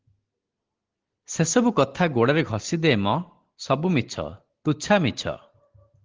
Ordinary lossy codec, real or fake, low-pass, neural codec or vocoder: Opus, 16 kbps; real; 7.2 kHz; none